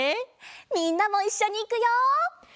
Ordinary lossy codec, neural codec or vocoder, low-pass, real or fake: none; none; none; real